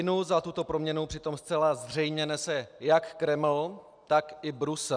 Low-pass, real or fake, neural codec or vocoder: 9.9 kHz; real; none